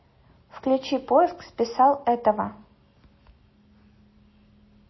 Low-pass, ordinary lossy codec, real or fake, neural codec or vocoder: 7.2 kHz; MP3, 24 kbps; real; none